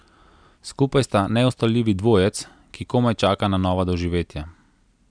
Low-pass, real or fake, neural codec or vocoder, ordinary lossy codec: 9.9 kHz; real; none; none